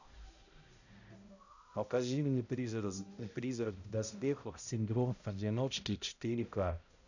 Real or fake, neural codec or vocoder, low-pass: fake; codec, 16 kHz, 0.5 kbps, X-Codec, HuBERT features, trained on balanced general audio; 7.2 kHz